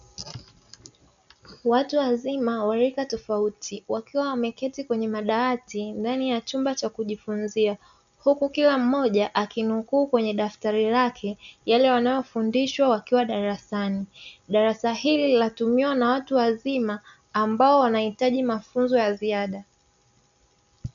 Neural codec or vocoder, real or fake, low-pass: none; real; 7.2 kHz